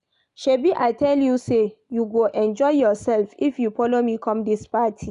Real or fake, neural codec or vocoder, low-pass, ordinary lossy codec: real; none; 10.8 kHz; none